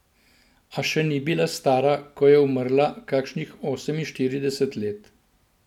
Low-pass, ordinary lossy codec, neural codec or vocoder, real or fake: 19.8 kHz; none; none; real